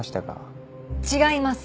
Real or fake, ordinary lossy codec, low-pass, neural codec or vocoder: real; none; none; none